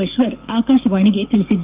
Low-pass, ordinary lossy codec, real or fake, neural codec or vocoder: 3.6 kHz; Opus, 24 kbps; fake; codec, 44.1 kHz, 7.8 kbps, DAC